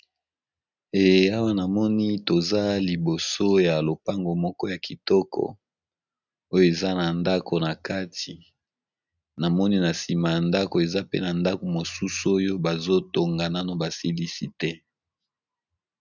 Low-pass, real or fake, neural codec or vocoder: 7.2 kHz; real; none